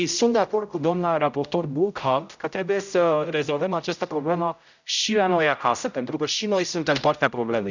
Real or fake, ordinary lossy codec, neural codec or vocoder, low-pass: fake; none; codec, 16 kHz, 0.5 kbps, X-Codec, HuBERT features, trained on general audio; 7.2 kHz